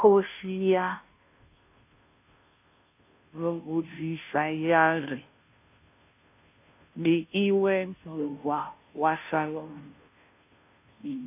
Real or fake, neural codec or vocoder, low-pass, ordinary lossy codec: fake; codec, 16 kHz, 0.5 kbps, FunCodec, trained on Chinese and English, 25 frames a second; 3.6 kHz; AAC, 32 kbps